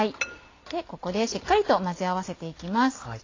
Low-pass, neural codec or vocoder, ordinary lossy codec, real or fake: 7.2 kHz; none; AAC, 32 kbps; real